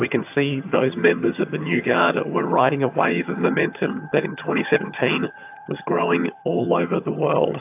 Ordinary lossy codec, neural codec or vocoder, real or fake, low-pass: AAC, 32 kbps; vocoder, 22.05 kHz, 80 mel bands, HiFi-GAN; fake; 3.6 kHz